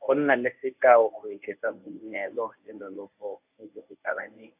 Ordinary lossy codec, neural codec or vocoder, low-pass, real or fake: AAC, 32 kbps; codec, 24 kHz, 0.9 kbps, WavTokenizer, medium speech release version 1; 3.6 kHz; fake